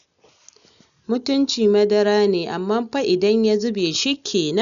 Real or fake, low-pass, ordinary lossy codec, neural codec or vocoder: real; 7.2 kHz; none; none